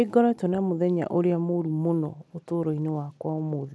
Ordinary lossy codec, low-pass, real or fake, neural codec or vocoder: none; none; real; none